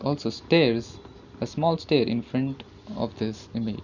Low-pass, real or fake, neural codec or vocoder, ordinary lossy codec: 7.2 kHz; real; none; none